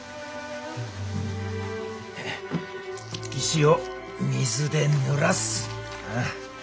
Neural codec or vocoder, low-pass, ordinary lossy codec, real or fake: none; none; none; real